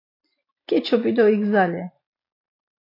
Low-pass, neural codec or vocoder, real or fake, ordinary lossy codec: 5.4 kHz; none; real; AAC, 32 kbps